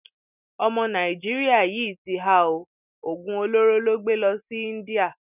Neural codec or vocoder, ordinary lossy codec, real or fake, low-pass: none; none; real; 3.6 kHz